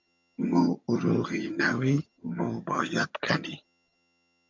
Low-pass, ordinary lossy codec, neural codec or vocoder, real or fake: 7.2 kHz; MP3, 64 kbps; vocoder, 22.05 kHz, 80 mel bands, HiFi-GAN; fake